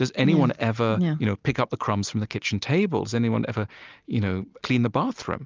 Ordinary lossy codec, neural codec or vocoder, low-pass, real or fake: Opus, 24 kbps; none; 7.2 kHz; real